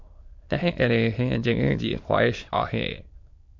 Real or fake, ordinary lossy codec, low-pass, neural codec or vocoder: fake; MP3, 48 kbps; 7.2 kHz; autoencoder, 22.05 kHz, a latent of 192 numbers a frame, VITS, trained on many speakers